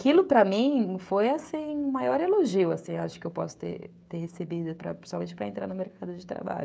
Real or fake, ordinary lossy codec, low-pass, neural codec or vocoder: fake; none; none; codec, 16 kHz, 16 kbps, FreqCodec, smaller model